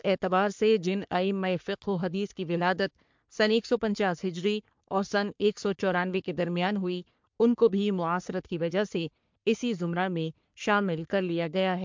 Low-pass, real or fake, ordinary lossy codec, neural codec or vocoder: 7.2 kHz; fake; MP3, 64 kbps; codec, 44.1 kHz, 3.4 kbps, Pupu-Codec